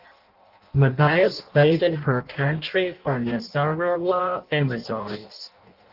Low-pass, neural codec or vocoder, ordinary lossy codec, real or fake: 5.4 kHz; codec, 16 kHz in and 24 kHz out, 0.6 kbps, FireRedTTS-2 codec; Opus, 24 kbps; fake